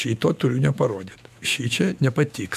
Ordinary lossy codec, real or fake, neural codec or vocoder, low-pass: MP3, 96 kbps; fake; autoencoder, 48 kHz, 128 numbers a frame, DAC-VAE, trained on Japanese speech; 14.4 kHz